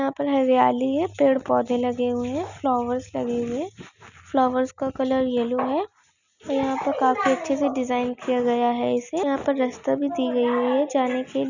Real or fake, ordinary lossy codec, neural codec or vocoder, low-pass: real; none; none; 7.2 kHz